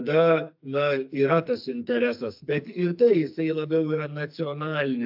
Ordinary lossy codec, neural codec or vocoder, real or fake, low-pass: AAC, 48 kbps; codec, 44.1 kHz, 2.6 kbps, SNAC; fake; 5.4 kHz